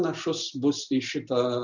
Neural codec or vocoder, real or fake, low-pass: none; real; 7.2 kHz